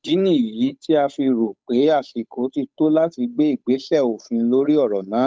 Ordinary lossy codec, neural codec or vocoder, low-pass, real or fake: none; codec, 16 kHz, 8 kbps, FunCodec, trained on Chinese and English, 25 frames a second; none; fake